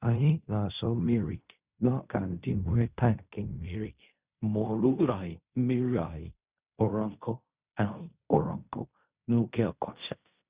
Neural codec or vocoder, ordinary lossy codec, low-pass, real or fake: codec, 16 kHz in and 24 kHz out, 0.4 kbps, LongCat-Audio-Codec, fine tuned four codebook decoder; Opus, 64 kbps; 3.6 kHz; fake